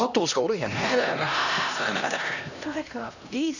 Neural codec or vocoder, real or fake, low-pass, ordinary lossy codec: codec, 16 kHz, 1 kbps, X-Codec, HuBERT features, trained on LibriSpeech; fake; 7.2 kHz; none